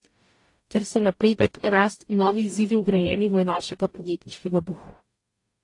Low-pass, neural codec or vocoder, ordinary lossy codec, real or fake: 10.8 kHz; codec, 44.1 kHz, 0.9 kbps, DAC; AAC, 48 kbps; fake